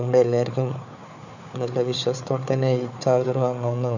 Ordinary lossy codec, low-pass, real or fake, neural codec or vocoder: none; 7.2 kHz; fake; codec, 16 kHz, 4 kbps, FunCodec, trained on Chinese and English, 50 frames a second